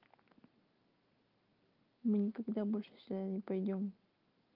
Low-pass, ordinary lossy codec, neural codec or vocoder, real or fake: 5.4 kHz; Opus, 24 kbps; none; real